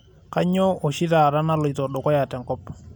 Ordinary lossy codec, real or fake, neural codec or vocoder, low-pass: none; real; none; none